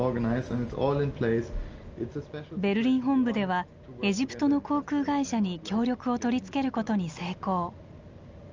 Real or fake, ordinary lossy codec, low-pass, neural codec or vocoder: real; Opus, 24 kbps; 7.2 kHz; none